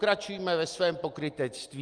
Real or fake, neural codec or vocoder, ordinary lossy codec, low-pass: real; none; Opus, 32 kbps; 9.9 kHz